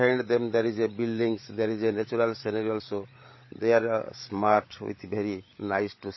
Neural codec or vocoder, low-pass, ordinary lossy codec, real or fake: codec, 16 kHz, 16 kbps, FreqCodec, larger model; 7.2 kHz; MP3, 24 kbps; fake